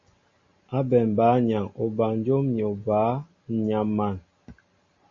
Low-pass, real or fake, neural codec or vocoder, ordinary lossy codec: 7.2 kHz; real; none; MP3, 32 kbps